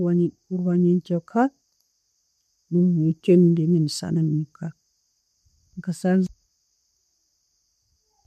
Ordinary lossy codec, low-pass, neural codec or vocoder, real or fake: MP3, 64 kbps; 14.4 kHz; none; real